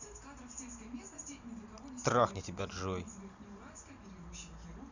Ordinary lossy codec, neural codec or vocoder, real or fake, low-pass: none; none; real; 7.2 kHz